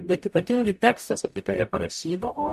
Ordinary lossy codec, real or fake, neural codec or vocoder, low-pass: MP3, 64 kbps; fake; codec, 44.1 kHz, 0.9 kbps, DAC; 14.4 kHz